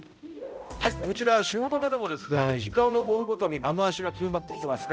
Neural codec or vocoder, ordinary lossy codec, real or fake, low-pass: codec, 16 kHz, 0.5 kbps, X-Codec, HuBERT features, trained on balanced general audio; none; fake; none